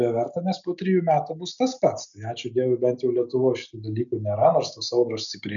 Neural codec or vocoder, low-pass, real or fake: none; 7.2 kHz; real